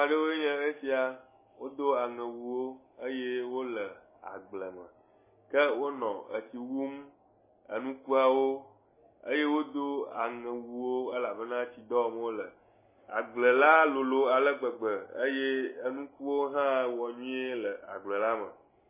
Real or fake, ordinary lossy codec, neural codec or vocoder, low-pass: real; MP3, 16 kbps; none; 3.6 kHz